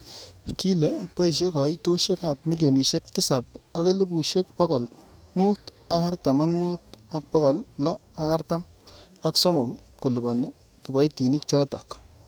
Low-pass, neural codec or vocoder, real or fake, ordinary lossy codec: none; codec, 44.1 kHz, 2.6 kbps, DAC; fake; none